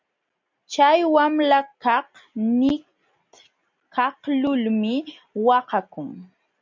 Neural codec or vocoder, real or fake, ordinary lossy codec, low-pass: none; real; MP3, 48 kbps; 7.2 kHz